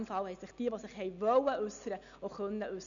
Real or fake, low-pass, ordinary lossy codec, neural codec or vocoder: real; 7.2 kHz; none; none